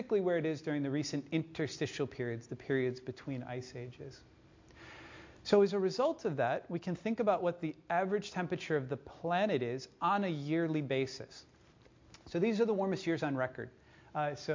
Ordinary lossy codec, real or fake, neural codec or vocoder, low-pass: MP3, 48 kbps; real; none; 7.2 kHz